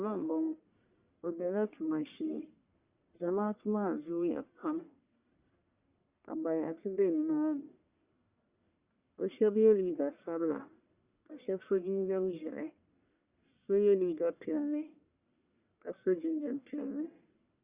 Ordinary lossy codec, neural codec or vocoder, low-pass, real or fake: Opus, 64 kbps; codec, 44.1 kHz, 1.7 kbps, Pupu-Codec; 3.6 kHz; fake